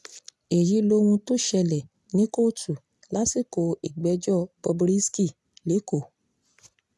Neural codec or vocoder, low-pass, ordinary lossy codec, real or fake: none; none; none; real